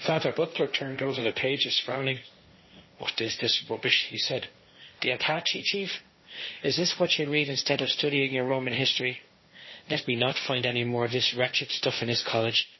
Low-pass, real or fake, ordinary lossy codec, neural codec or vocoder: 7.2 kHz; fake; MP3, 24 kbps; codec, 16 kHz, 1.1 kbps, Voila-Tokenizer